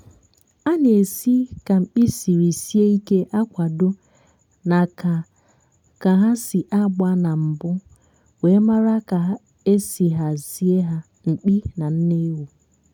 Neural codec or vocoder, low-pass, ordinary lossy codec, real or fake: none; 19.8 kHz; none; real